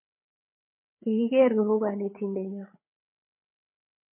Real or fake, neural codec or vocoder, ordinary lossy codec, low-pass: fake; codec, 16 kHz, 8 kbps, FreqCodec, larger model; MP3, 32 kbps; 3.6 kHz